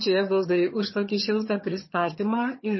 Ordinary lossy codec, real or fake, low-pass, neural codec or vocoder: MP3, 24 kbps; fake; 7.2 kHz; vocoder, 22.05 kHz, 80 mel bands, HiFi-GAN